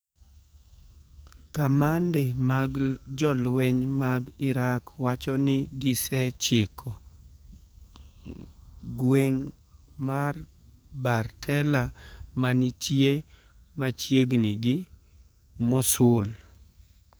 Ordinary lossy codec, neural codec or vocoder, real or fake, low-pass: none; codec, 44.1 kHz, 2.6 kbps, SNAC; fake; none